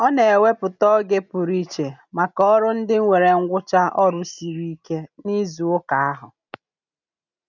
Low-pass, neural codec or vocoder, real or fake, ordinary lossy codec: 7.2 kHz; none; real; none